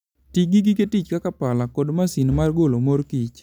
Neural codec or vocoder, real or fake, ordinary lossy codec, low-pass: none; real; none; 19.8 kHz